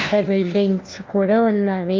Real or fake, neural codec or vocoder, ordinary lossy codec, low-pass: fake; codec, 16 kHz, 1 kbps, FunCodec, trained on LibriTTS, 50 frames a second; Opus, 32 kbps; 7.2 kHz